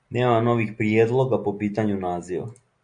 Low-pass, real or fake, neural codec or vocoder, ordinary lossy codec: 9.9 kHz; real; none; Opus, 64 kbps